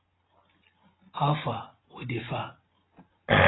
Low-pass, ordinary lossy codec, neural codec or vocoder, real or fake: 7.2 kHz; AAC, 16 kbps; none; real